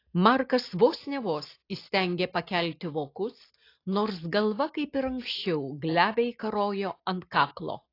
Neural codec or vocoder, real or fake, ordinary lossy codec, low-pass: none; real; AAC, 32 kbps; 5.4 kHz